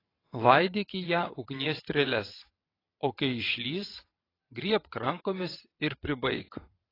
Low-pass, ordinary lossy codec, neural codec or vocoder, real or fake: 5.4 kHz; AAC, 24 kbps; vocoder, 22.05 kHz, 80 mel bands, WaveNeXt; fake